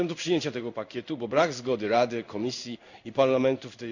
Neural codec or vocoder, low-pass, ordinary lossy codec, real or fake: codec, 16 kHz in and 24 kHz out, 1 kbps, XY-Tokenizer; 7.2 kHz; none; fake